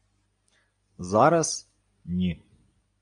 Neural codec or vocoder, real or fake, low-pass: none; real; 9.9 kHz